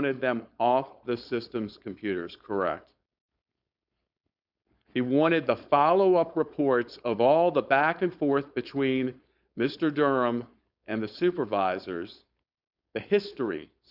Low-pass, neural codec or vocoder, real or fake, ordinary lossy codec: 5.4 kHz; codec, 16 kHz, 4.8 kbps, FACodec; fake; Opus, 64 kbps